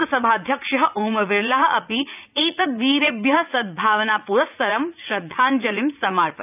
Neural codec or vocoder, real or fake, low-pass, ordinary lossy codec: vocoder, 44.1 kHz, 80 mel bands, Vocos; fake; 3.6 kHz; none